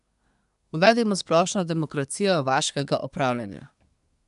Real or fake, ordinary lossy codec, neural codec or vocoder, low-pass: fake; none; codec, 24 kHz, 1 kbps, SNAC; 10.8 kHz